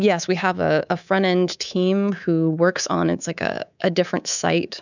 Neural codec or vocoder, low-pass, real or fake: codec, 24 kHz, 3.1 kbps, DualCodec; 7.2 kHz; fake